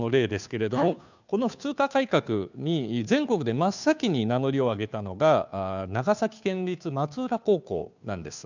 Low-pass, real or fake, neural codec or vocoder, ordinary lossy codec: 7.2 kHz; fake; codec, 16 kHz, 2 kbps, FunCodec, trained on Chinese and English, 25 frames a second; none